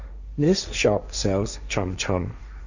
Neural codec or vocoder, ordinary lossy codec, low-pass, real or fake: codec, 16 kHz, 1.1 kbps, Voila-Tokenizer; none; 7.2 kHz; fake